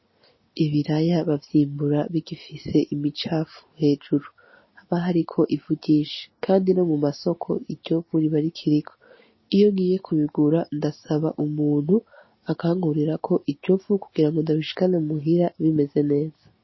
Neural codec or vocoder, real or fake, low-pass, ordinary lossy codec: none; real; 7.2 kHz; MP3, 24 kbps